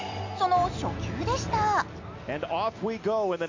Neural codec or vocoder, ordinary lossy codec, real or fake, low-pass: none; MP3, 48 kbps; real; 7.2 kHz